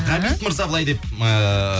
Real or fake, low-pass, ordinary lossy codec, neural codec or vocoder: real; none; none; none